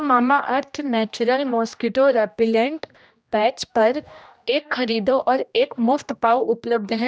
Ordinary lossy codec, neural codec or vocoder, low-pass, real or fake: none; codec, 16 kHz, 1 kbps, X-Codec, HuBERT features, trained on general audio; none; fake